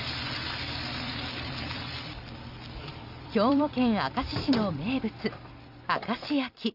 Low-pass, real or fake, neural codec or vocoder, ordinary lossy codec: 5.4 kHz; fake; vocoder, 44.1 kHz, 128 mel bands every 256 samples, BigVGAN v2; none